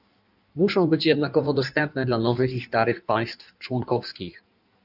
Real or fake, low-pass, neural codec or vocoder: fake; 5.4 kHz; codec, 16 kHz in and 24 kHz out, 1.1 kbps, FireRedTTS-2 codec